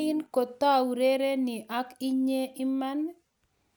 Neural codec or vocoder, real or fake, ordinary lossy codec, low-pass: none; real; none; none